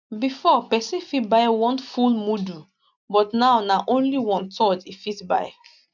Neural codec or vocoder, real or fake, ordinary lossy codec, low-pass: none; real; none; 7.2 kHz